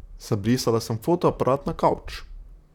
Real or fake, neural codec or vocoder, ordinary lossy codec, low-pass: fake; vocoder, 44.1 kHz, 128 mel bands, Pupu-Vocoder; none; 19.8 kHz